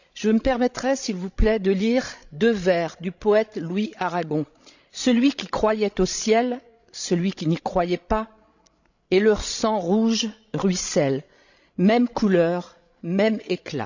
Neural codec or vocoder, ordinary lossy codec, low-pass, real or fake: codec, 16 kHz, 16 kbps, FreqCodec, larger model; none; 7.2 kHz; fake